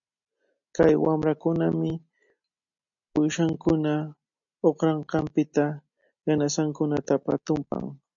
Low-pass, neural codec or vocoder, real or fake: 7.2 kHz; none; real